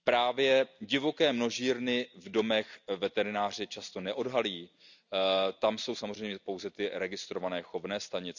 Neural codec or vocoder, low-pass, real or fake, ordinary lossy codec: none; 7.2 kHz; real; none